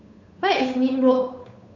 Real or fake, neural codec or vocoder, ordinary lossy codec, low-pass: fake; codec, 16 kHz, 8 kbps, FunCodec, trained on Chinese and English, 25 frames a second; MP3, 48 kbps; 7.2 kHz